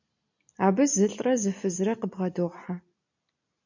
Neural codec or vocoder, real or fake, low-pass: none; real; 7.2 kHz